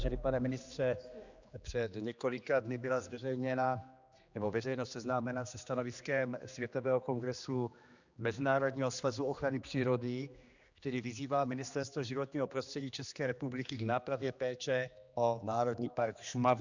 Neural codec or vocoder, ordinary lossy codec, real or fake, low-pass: codec, 16 kHz, 2 kbps, X-Codec, HuBERT features, trained on general audio; Opus, 64 kbps; fake; 7.2 kHz